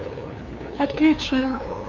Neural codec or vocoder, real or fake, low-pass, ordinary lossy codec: codec, 16 kHz, 2 kbps, FunCodec, trained on LibriTTS, 25 frames a second; fake; 7.2 kHz; Opus, 64 kbps